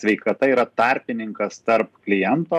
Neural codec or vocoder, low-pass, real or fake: none; 14.4 kHz; real